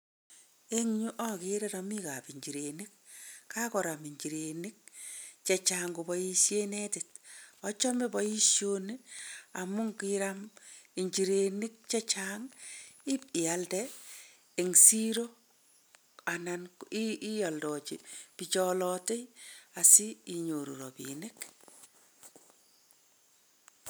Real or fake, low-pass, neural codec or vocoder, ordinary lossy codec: real; none; none; none